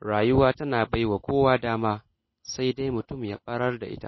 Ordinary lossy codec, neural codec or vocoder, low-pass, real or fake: MP3, 24 kbps; none; 7.2 kHz; real